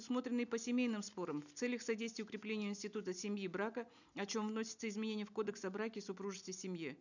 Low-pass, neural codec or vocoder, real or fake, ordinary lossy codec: 7.2 kHz; none; real; none